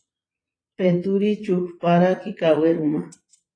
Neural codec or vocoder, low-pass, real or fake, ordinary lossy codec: vocoder, 22.05 kHz, 80 mel bands, Vocos; 9.9 kHz; fake; AAC, 32 kbps